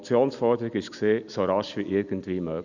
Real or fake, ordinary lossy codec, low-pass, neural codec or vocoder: real; none; 7.2 kHz; none